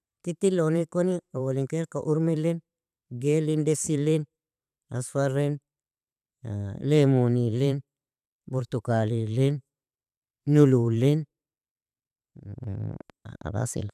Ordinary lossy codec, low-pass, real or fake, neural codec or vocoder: none; 14.4 kHz; fake; vocoder, 44.1 kHz, 128 mel bands every 512 samples, BigVGAN v2